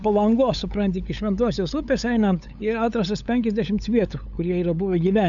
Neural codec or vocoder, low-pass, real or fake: codec, 16 kHz, 8 kbps, FunCodec, trained on LibriTTS, 25 frames a second; 7.2 kHz; fake